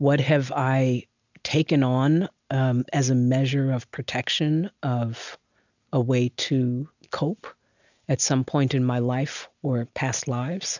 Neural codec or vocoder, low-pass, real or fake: none; 7.2 kHz; real